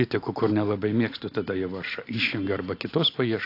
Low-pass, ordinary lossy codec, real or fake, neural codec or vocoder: 5.4 kHz; AAC, 32 kbps; real; none